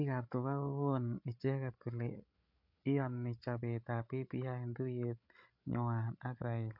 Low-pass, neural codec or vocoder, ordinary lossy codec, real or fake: 5.4 kHz; codec, 16 kHz, 8 kbps, FreqCodec, larger model; MP3, 48 kbps; fake